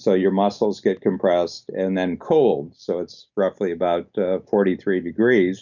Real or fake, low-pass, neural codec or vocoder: real; 7.2 kHz; none